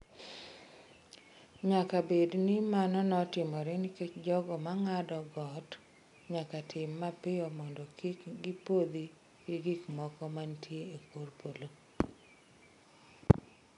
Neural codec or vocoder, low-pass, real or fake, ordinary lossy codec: none; 10.8 kHz; real; none